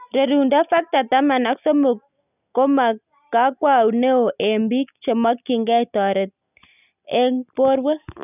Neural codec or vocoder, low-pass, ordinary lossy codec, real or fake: none; 3.6 kHz; none; real